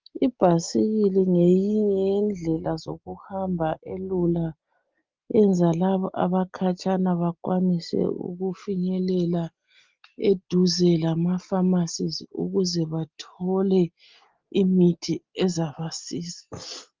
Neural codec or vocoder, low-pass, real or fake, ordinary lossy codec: none; 7.2 kHz; real; Opus, 32 kbps